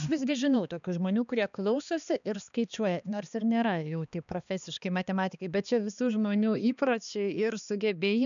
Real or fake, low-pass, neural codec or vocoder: fake; 7.2 kHz; codec, 16 kHz, 2 kbps, X-Codec, HuBERT features, trained on balanced general audio